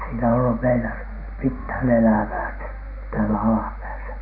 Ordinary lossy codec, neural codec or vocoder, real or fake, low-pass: none; none; real; 5.4 kHz